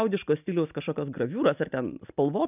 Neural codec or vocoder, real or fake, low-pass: none; real; 3.6 kHz